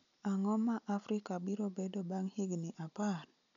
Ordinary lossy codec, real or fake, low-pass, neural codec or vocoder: MP3, 96 kbps; real; 7.2 kHz; none